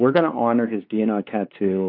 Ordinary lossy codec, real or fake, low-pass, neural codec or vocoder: AAC, 32 kbps; fake; 5.4 kHz; codec, 16 kHz, 4 kbps, X-Codec, WavLM features, trained on Multilingual LibriSpeech